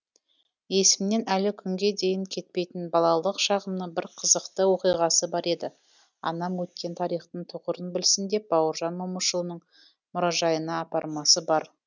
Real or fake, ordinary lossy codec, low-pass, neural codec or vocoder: real; none; 7.2 kHz; none